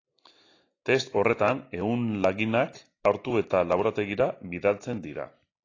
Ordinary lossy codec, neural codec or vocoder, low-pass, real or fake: AAC, 32 kbps; none; 7.2 kHz; real